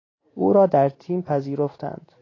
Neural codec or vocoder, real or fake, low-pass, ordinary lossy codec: none; real; 7.2 kHz; AAC, 32 kbps